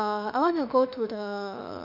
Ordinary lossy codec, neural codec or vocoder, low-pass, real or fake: none; autoencoder, 48 kHz, 32 numbers a frame, DAC-VAE, trained on Japanese speech; 5.4 kHz; fake